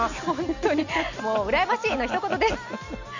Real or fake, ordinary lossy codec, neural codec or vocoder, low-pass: real; none; none; 7.2 kHz